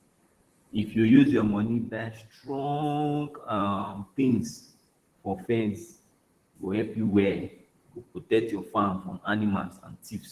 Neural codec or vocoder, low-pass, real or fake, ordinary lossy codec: vocoder, 44.1 kHz, 128 mel bands, Pupu-Vocoder; 14.4 kHz; fake; Opus, 16 kbps